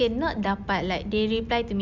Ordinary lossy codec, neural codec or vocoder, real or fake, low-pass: none; none; real; 7.2 kHz